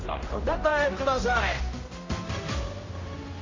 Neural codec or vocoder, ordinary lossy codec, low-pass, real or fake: codec, 16 kHz, 0.5 kbps, X-Codec, HuBERT features, trained on general audio; MP3, 32 kbps; 7.2 kHz; fake